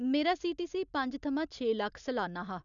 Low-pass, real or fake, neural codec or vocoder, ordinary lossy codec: 7.2 kHz; real; none; none